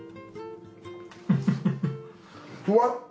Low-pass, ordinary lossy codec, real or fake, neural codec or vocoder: none; none; real; none